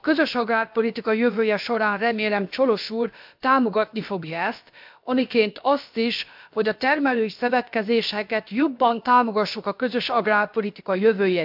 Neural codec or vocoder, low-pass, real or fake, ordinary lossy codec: codec, 16 kHz, about 1 kbps, DyCAST, with the encoder's durations; 5.4 kHz; fake; MP3, 48 kbps